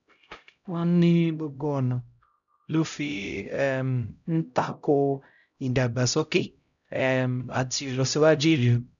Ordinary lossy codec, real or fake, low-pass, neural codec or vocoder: none; fake; 7.2 kHz; codec, 16 kHz, 0.5 kbps, X-Codec, HuBERT features, trained on LibriSpeech